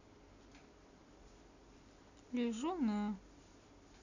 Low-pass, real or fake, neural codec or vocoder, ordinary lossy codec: 7.2 kHz; real; none; none